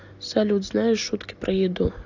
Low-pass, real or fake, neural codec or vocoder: 7.2 kHz; real; none